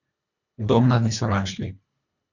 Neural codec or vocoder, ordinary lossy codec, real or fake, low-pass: codec, 24 kHz, 1.5 kbps, HILCodec; none; fake; 7.2 kHz